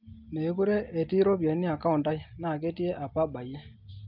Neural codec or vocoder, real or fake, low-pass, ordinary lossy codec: none; real; 5.4 kHz; Opus, 24 kbps